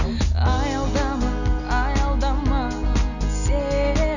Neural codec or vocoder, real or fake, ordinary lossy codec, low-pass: none; real; none; 7.2 kHz